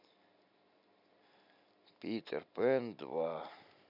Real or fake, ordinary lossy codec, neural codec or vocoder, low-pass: real; none; none; 5.4 kHz